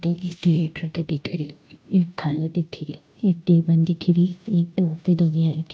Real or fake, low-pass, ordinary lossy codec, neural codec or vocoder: fake; none; none; codec, 16 kHz, 0.5 kbps, FunCodec, trained on Chinese and English, 25 frames a second